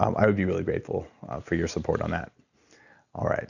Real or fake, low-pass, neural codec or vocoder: real; 7.2 kHz; none